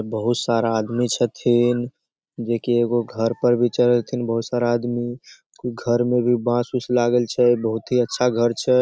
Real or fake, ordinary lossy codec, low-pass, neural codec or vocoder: real; none; none; none